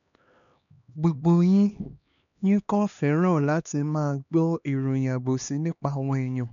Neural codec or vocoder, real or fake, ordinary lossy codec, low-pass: codec, 16 kHz, 2 kbps, X-Codec, HuBERT features, trained on LibriSpeech; fake; MP3, 96 kbps; 7.2 kHz